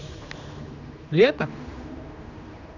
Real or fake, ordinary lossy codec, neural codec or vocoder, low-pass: fake; none; codec, 16 kHz, 2 kbps, X-Codec, HuBERT features, trained on general audio; 7.2 kHz